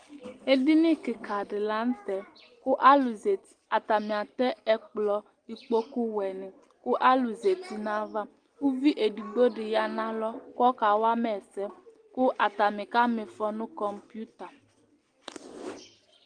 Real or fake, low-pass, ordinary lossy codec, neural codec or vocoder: real; 9.9 kHz; Opus, 24 kbps; none